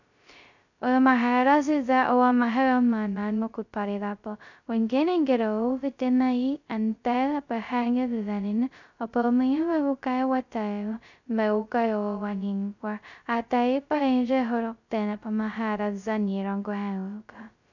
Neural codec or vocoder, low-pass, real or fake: codec, 16 kHz, 0.2 kbps, FocalCodec; 7.2 kHz; fake